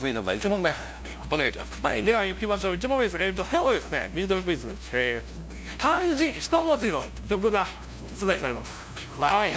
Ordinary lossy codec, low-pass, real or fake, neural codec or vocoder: none; none; fake; codec, 16 kHz, 0.5 kbps, FunCodec, trained on LibriTTS, 25 frames a second